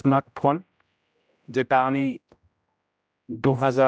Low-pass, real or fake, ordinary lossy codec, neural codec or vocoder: none; fake; none; codec, 16 kHz, 0.5 kbps, X-Codec, HuBERT features, trained on general audio